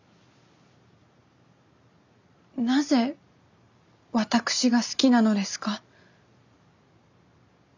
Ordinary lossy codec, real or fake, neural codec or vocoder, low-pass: none; real; none; 7.2 kHz